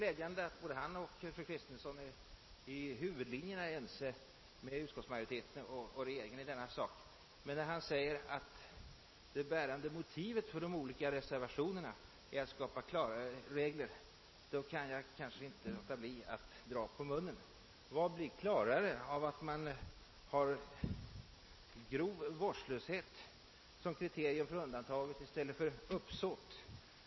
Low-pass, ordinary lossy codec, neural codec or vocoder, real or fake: 7.2 kHz; MP3, 24 kbps; none; real